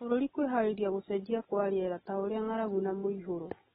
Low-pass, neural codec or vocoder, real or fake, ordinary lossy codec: 7.2 kHz; none; real; AAC, 16 kbps